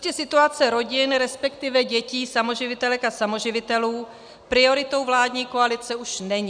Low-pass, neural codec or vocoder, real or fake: 9.9 kHz; none; real